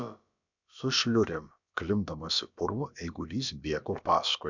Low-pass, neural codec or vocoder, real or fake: 7.2 kHz; codec, 16 kHz, about 1 kbps, DyCAST, with the encoder's durations; fake